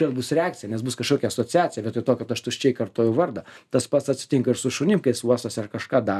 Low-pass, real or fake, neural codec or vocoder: 14.4 kHz; real; none